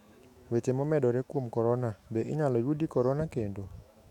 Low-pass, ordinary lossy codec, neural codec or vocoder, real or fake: 19.8 kHz; none; autoencoder, 48 kHz, 128 numbers a frame, DAC-VAE, trained on Japanese speech; fake